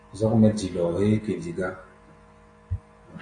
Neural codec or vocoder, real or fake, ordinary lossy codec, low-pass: none; real; AAC, 48 kbps; 9.9 kHz